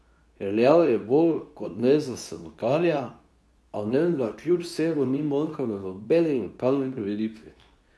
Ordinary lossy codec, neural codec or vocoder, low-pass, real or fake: none; codec, 24 kHz, 0.9 kbps, WavTokenizer, medium speech release version 2; none; fake